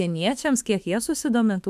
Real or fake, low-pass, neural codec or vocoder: fake; 14.4 kHz; autoencoder, 48 kHz, 32 numbers a frame, DAC-VAE, trained on Japanese speech